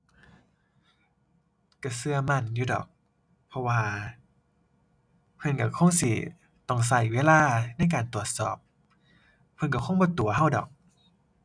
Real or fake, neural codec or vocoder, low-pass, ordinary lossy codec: real; none; 9.9 kHz; none